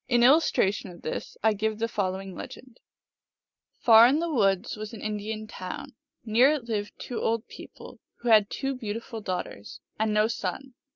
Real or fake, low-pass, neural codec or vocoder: real; 7.2 kHz; none